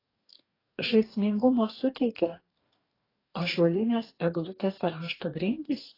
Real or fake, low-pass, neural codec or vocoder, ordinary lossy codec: fake; 5.4 kHz; codec, 44.1 kHz, 2.6 kbps, DAC; AAC, 24 kbps